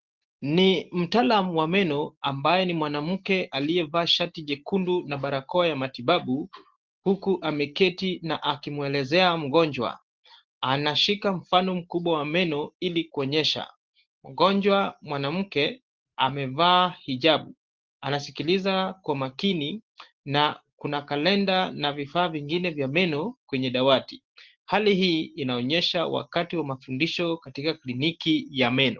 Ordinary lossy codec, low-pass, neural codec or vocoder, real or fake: Opus, 16 kbps; 7.2 kHz; none; real